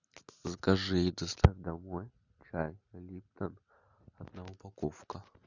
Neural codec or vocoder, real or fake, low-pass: none; real; 7.2 kHz